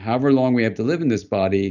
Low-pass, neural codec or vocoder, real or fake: 7.2 kHz; none; real